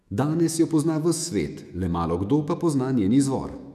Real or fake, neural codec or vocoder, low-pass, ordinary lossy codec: fake; autoencoder, 48 kHz, 128 numbers a frame, DAC-VAE, trained on Japanese speech; 14.4 kHz; none